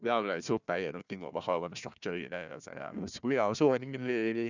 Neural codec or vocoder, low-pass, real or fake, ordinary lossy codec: codec, 16 kHz, 1 kbps, FunCodec, trained on Chinese and English, 50 frames a second; 7.2 kHz; fake; none